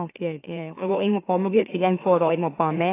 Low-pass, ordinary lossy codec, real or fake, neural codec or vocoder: 3.6 kHz; AAC, 24 kbps; fake; autoencoder, 44.1 kHz, a latent of 192 numbers a frame, MeloTTS